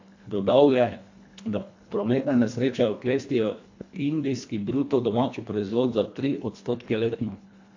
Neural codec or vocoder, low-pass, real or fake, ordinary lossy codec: codec, 24 kHz, 1.5 kbps, HILCodec; 7.2 kHz; fake; AAC, 48 kbps